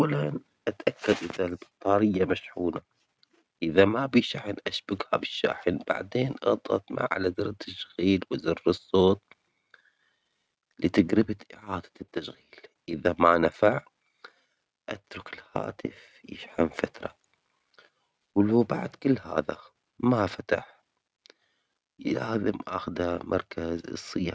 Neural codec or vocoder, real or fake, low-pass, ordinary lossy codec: none; real; none; none